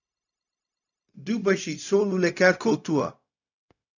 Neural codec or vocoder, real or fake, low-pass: codec, 16 kHz, 0.4 kbps, LongCat-Audio-Codec; fake; 7.2 kHz